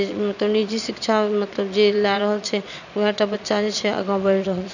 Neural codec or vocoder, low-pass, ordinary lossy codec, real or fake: vocoder, 22.05 kHz, 80 mel bands, Vocos; 7.2 kHz; none; fake